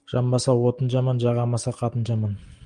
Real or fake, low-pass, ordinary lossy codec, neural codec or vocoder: real; 9.9 kHz; Opus, 24 kbps; none